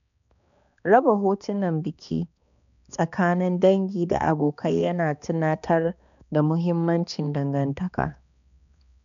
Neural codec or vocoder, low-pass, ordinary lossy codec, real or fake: codec, 16 kHz, 2 kbps, X-Codec, HuBERT features, trained on balanced general audio; 7.2 kHz; none; fake